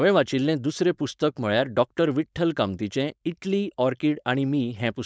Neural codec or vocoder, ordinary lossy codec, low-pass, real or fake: codec, 16 kHz, 4.8 kbps, FACodec; none; none; fake